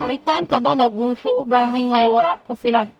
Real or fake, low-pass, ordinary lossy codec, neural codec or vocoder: fake; 19.8 kHz; none; codec, 44.1 kHz, 0.9 kbps, DAC